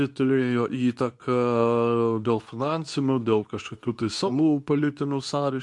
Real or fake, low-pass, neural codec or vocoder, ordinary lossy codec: fake; 10.8 kHz; codec, 24 kHz, 0.9 kbps, WavTokenizer, medium speech release version 2; MP3, 64 kbps